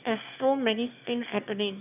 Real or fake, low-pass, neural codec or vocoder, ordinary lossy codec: fake; 3.6 kHz; autoencoder, 22.05 kHz, a latent of 192 numbers a frame, VITS, trained on one speaker; none